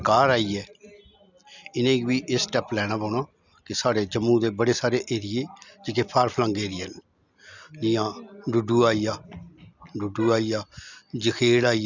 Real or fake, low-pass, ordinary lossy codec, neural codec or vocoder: real; 7.2 kHz; none; none